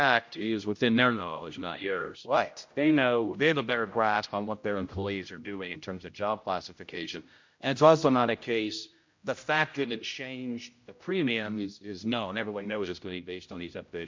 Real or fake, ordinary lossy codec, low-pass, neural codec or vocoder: fake; MP3, 48 kbps; 7.2 kHz; codec, 16 kHz, 0.5 kbps, X-Codec, HuBERT features, trained on general audio